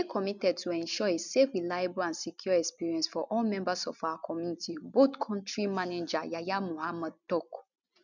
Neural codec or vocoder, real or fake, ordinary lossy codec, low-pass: none; real; none; 7.2 kHz